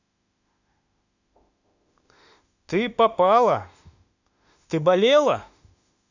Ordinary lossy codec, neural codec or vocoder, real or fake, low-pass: none; autoencoder, 48 kHz, 32 numbers a frame, DAC-VAE, trained on Japanese speech; fake; 7.2 kHz